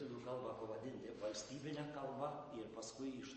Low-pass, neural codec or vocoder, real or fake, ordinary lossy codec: 10.8 kHz; none; real; MP3, 32 kbps